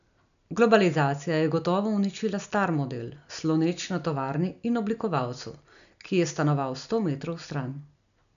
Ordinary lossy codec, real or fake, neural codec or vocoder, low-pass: none; real; none; 7.2 kHz